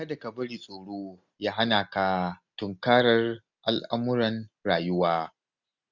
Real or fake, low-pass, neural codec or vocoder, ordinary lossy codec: real; 7.2 kHz; none; none